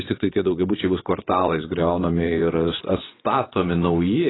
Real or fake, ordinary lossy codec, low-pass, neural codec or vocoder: fake; AAC, 16 kbps; 7.2 kHz; vocoder, 22.05 kHz, 80 mel bands, WaveNeXt